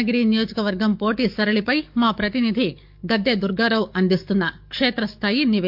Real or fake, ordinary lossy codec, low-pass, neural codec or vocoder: fake; MP3, 48 kbps; 5.4 kHz; codec, 44.1 kHz, 7.8 kbps, DAC